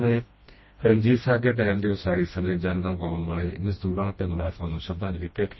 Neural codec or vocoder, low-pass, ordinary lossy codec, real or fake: codec, 16 kHz, 1 kbps, FreqCodec, smaller model; 7.2 kHz; MP3, 24 kbps; fake